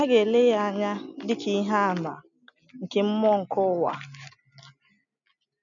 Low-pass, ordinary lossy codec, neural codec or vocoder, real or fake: 7.2 kHz; none; none; real